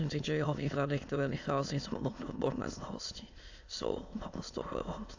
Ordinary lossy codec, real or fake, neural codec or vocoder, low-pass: AAC, 48 kbps; fake; autoencoder, 22.05 kHz, a latent of 192 numbers a frame, VITS, trained on many speakers; 7.2 kHz